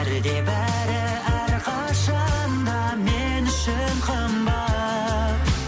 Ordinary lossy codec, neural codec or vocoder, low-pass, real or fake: none; none; none; real